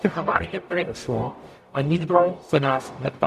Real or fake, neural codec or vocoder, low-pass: fake; codec, 44.1 kHz, 0.9 kbps, DAC; 14.4 kHz